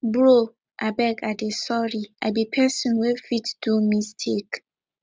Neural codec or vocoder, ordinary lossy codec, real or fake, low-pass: none; none; real; none